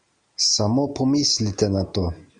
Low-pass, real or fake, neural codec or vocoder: 9.9 kHz; real; none